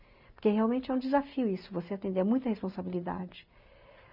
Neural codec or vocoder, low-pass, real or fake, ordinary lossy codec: none; 5.4 kHz; real; none